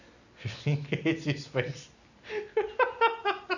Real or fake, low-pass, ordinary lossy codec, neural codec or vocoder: real; 7.2 kHz; none; none